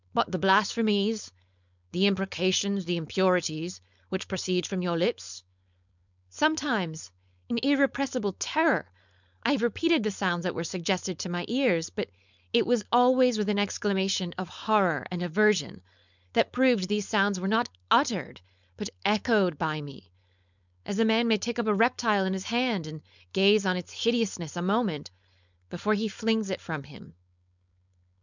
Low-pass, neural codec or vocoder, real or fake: 7.2 kHz; codec, 16 kHz, 4.8 kbps, FACodec; fake